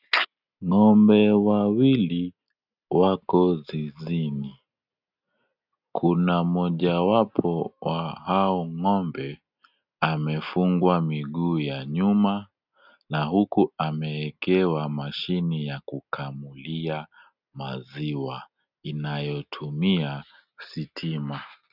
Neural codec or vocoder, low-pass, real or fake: none; 5.4 kHz; real